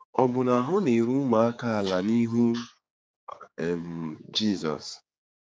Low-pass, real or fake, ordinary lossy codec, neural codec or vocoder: none; fake; none; codec, 16 kHz, 4 kbps, X-Codec, HuBERT features, trained on general audio